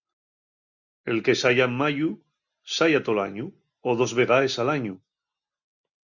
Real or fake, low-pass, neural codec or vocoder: real; 7.2 kHz; none